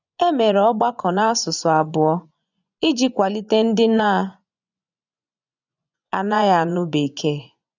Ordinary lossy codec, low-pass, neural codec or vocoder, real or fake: none; 7.2 kHz; vocoder, 24 kHz, 100 mel bands, Vocos; fake